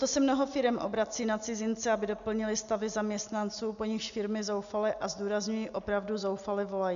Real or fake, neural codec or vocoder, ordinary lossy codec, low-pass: real; none; MP3, 96 kbps; 7.2 kHz